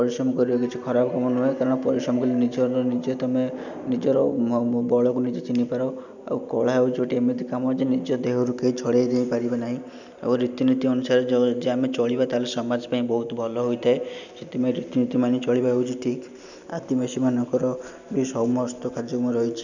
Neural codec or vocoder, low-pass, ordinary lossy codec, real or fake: none; 7.2 kHz; none; real